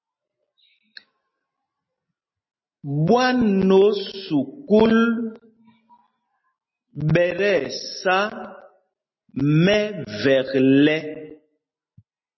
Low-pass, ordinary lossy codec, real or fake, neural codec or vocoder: 7.2 kHz; MP3, 24 kbps; real; none